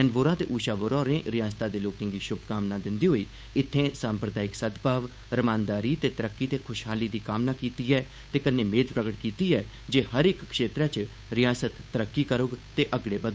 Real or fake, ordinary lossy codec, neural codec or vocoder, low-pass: fake; none; codec, 16 kHz, 8 kbps, FunCodec, trained on Chinese and English, 25 frames a second; none